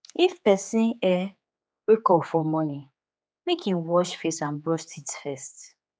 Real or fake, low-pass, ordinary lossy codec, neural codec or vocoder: fake; none; none; codec, 16 kHz, 4 kbps, X-Codec, HuBERT features, trained on general audio